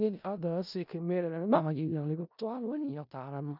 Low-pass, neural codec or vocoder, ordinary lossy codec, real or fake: 5.4 kHz; codec, 16 kHz in and 24 kHz out, 0.4 kbps, LongCat-Audio-Codec, four codebook decoder; none; fake